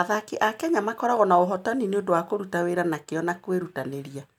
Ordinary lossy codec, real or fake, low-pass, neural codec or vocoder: MP3, 96 kbps; real; 19.8 kHz; none